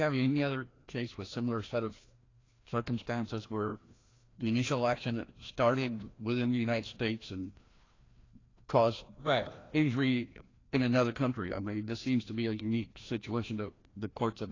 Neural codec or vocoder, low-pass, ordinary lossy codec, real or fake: codec, 16 kHz, 1 kbps, FreqCodec, larger model; 7.2 kHz; AAC, 32 kbps; fake